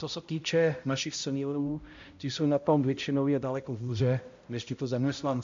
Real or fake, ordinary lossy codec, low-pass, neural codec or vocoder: fake; MP3, 48 kbps; 7.2 kHz; codec, 16 kHz, 0.5 kbps, X-Codec, HuBERT features, trained on balanced general audio